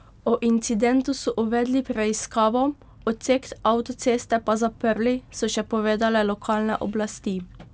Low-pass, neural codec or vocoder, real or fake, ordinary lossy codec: none; none; real; none